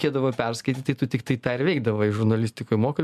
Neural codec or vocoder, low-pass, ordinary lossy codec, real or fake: none; 14.4 kHz; MP3, 96 kbps; real